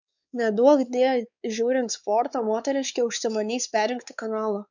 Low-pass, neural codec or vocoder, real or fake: 7.2 kHz; codec, 16 kHz, 4 kbps, X-Codec, WavLM features, trained on Multilingual LibriSpeech; fake